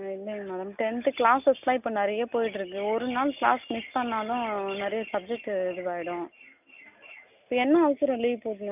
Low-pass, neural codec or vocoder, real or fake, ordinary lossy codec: 3.6 kHz; none; real; none